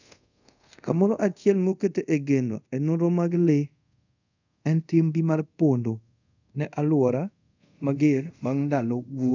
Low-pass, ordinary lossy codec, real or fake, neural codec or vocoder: 7.2 kHz; none; fake; codec, 24 kHz, 0.5 kbps, DualCodec